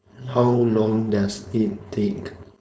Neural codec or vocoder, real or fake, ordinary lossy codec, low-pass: codec, 16 kHz, 4.8 kbps, FACodec; fake; none; none